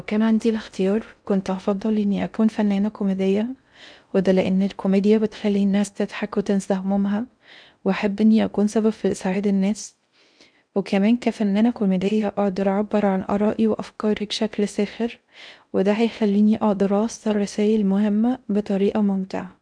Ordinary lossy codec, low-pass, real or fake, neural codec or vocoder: none; 9.9 kHz; fake; codec, 16 kHz in and 24 kHz out, 0.6 kbps, FocalCodec, streaming, 2048 codes